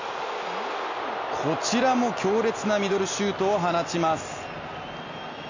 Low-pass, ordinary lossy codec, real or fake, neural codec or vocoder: 7.2 kHz; none; real; none